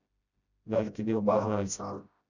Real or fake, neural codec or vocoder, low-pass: fake; codec, 16 kHz, 0.5 kbps, FreqCodec, smaller model; 7.2 kHz